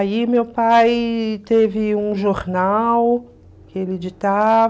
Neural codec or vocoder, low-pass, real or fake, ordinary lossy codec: none; none; real; none